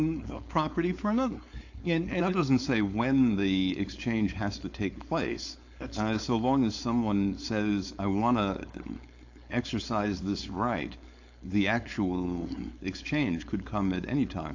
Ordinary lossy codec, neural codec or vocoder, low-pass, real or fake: AAC, 48 kbps; codec, 16 kHz, 4.8 kbps, FACodec; 7.2 kHz; fake